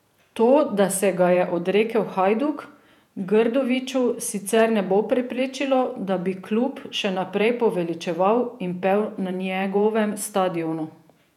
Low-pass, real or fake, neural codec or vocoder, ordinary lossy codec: 19.8 kHz; fake; vocoder, 48 kHz, 128 mel bands, Vocos; none